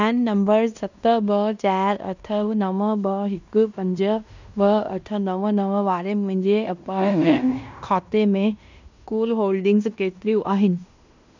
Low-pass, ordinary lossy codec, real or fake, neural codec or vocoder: 7.2 kHz; none; fake; codec, 16 kHz in and 24 kHz out, 0.9 kbps, LongCat-Audio-Codec, fine tuned four codebook decoder